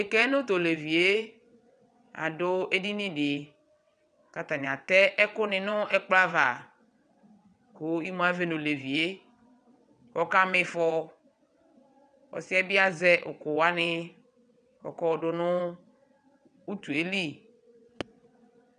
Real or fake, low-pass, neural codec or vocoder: fake; 9.9 kHz; vocoder, 22.05 kHz, 80 mel bands, WaveNeXt